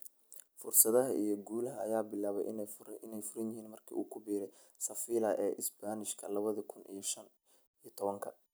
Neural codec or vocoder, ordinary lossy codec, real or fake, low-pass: none; none; real; none